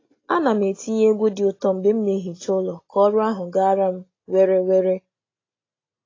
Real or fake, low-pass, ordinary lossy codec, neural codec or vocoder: real; 7.2 kHz; AAC, 32 kbps; none